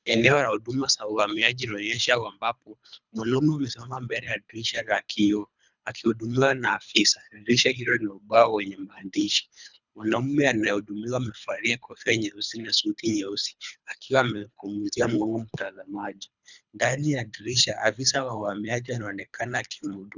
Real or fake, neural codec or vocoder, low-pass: fake; codec, 24 kHz, 3 kbps, HILCodec; 7.2 kHz